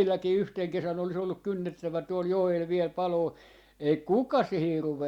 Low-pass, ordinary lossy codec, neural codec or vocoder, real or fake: 19.8 kHz; Opus, 64 kbps; none; real